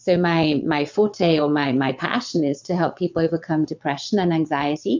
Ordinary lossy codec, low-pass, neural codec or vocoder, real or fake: MP3, 64 kbps; 7.2 kHz; vocoder, 22.05 kHz, 80 mel bands, WaveNeXt; fake